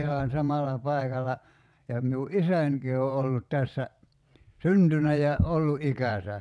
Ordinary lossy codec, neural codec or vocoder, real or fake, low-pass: none; vocoder, 22.05 kHz, 80 mel bands, WaveNeXt; fake; none